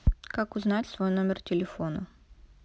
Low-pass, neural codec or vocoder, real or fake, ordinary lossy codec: none; none; real; none